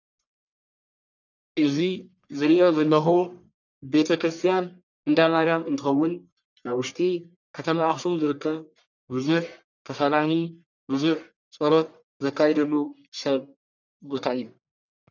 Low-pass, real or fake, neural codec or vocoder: 7.2 kHz; fake; codec, 44.1 kHz, 1.7 kbps, Pupu-Codec